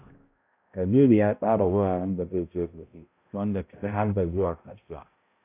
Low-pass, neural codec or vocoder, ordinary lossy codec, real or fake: 3.6 kHz; codec, 16 kHz, 0.5 kbps, X-Codec, HuBERT features, trained on balanced general audio; AAC, 24 kbps; fake